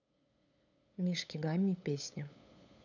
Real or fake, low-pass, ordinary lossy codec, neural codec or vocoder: fake; 7.2 kHz; none; codec, 16 kHz, 8 kbps, FunCodec, trained on LibriTTS, 25 frames a second